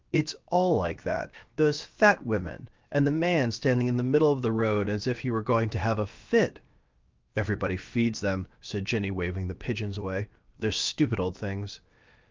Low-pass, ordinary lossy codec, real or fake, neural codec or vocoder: 7.2 kHz; Opus, 24 kbps; fake; codec, 16 kHz, 0.7 kbps, FocalCodec